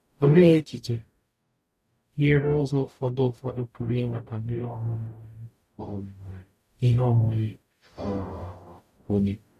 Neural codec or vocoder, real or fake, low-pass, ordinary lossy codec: codec, 44.1 kHz, 0.9 kbps, DAC; fake; 14.4 kHz; none